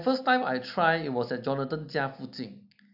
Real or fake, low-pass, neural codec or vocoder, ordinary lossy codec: real; 5.4 kHz; none; AAC, 48 kbps